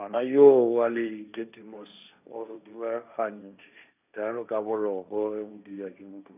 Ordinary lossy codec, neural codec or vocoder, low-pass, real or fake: none; codec, 16 kHz, 1.1 kbps, Voila-Tokenizer; 3.6 kHz; fake